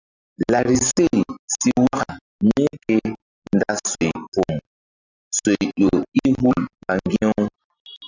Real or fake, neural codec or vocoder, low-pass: real; none; 7.2 kHz